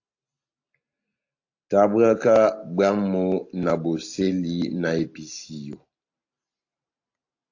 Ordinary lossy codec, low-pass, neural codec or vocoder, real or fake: AAC, 48 kbps; 7.2 kHz; none; real